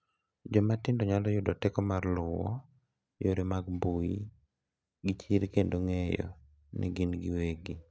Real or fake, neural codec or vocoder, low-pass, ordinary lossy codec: real; none; none; none